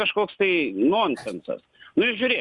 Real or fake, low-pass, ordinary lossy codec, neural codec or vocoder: real; 10.8 kHz; Opus, 64 kbps; none